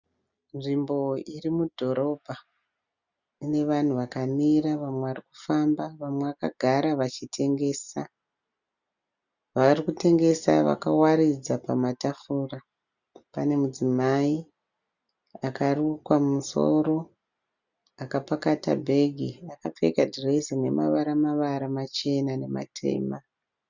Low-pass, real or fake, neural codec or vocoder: 7.2 kHz; real; none